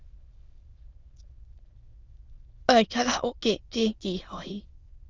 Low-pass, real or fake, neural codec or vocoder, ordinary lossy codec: 7.2 kHz; fake; autoencoder, 22.05 kHz, a latent of 192 numbers a frame, VITS, trained on many speakers; Opus, 32 kbps